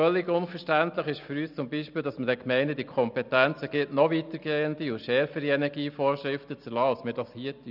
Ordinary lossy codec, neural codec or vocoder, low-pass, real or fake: none; none; 5.4 kHz; real